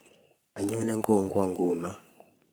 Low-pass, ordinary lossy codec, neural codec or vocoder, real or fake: none; none; codec, 44.1 kHz, 3.4 kbps, Pupu-Codec; fake